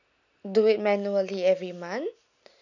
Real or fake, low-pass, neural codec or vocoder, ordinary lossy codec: real; 7.2 kHz; none; none